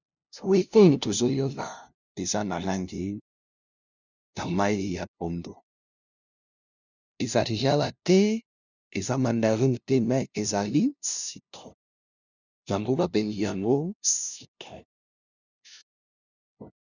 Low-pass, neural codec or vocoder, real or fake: 7.2 kHz; codec, 16 kHz, 0.5 kbps, FunCodec, trained on LibriTTS, 25 frames a second; fake